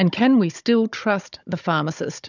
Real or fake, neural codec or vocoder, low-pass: fake; codec, 16 kHz, 16 kbps, FunCodec, trained on Chinese and English, 50 frames a second; 7.2 kHz